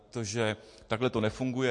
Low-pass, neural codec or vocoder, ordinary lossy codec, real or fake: 10.8 kHz; none; MP3, 48 kbps; real